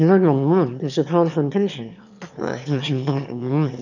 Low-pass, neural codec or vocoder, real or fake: 7.2 kHz; autoencoder, 22.05 kHz, a latent of 192 numbers a frame, VITS, trained on one speaker; fake